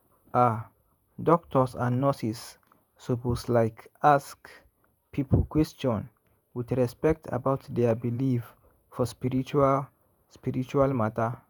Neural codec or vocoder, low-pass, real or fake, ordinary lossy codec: vocoder, 48 kHz, 128 mel bands, Vocos; none; fake; none